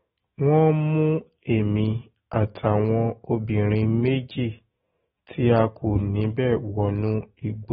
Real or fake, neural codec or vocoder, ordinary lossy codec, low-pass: fake; autoencoder, 48 kHz, 128 numbers a frame, DAC-VAE, trained on Japanese speech; AAC, 16 kbps; 19.8 kHz